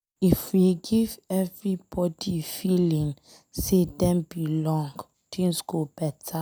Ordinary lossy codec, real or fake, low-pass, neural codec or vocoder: none; real; none; none